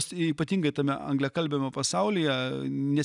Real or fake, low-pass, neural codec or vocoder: real; 10.8 kHz; none